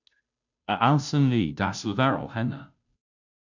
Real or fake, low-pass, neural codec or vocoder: fake; 7.2 kHz; codec, 16 kHz, 0.5 kbps, FunCodec, trained on Chinese and English, 25 frames a second